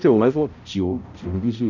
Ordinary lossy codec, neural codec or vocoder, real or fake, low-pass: none; codec, 16 kHz, 0.5 kbps, X-Codec, HuBERT features, trained on balanced general audio; fake; 7.2 kHz